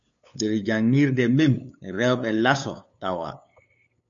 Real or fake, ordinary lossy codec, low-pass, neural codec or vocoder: fake; MP3, 48 kbps; 7.2 kHz; codec, 16 kHz, 8 kbps, FunCodec, trained on LibriTTS, 25 frames a second